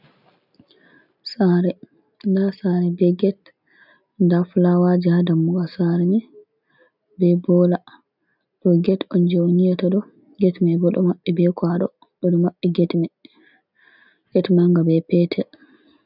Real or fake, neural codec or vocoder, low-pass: real; none; 5.4 kHz